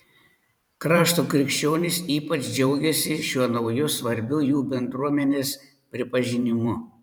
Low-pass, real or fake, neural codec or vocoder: 19.8 kHz; fake; vocoder, 44.1 kHz, 128 mel bands every 512 samples, BigVGAN v2